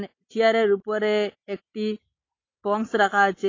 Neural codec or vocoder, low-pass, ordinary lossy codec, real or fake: none; 7.2 kHz; AAC, 32 kbps; real